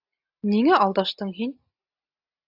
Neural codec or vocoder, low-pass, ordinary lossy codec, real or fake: none; 5.4 kHz; AAC, 48 kbps; real